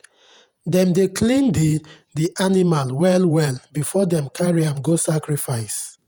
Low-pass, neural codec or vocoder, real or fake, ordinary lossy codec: none; vocoder, 48 kHz, 128 mel bands, Vocos; fake; none